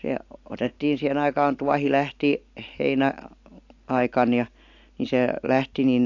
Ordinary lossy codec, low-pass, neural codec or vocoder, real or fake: AAC, 48 kbps; 7.2 kHz; none; real